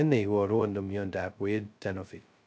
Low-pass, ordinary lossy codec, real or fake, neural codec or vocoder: none; none; fake; codec, 16 kHz, 0.2 kbps, FocalCodec